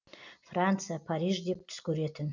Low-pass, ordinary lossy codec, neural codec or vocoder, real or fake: 7.2 kHz; none; none; real